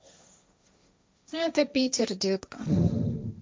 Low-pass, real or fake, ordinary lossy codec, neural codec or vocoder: none; fake; none; codec, 16 kHz, 1.1 kbps, Voila-Tokenizer